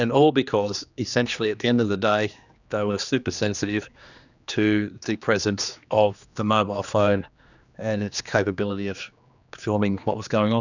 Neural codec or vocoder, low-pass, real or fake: codec, 16 kHz, 2 kbps, X-Codec, HuBERT features, trained on general audio; 7.2 kHz; fake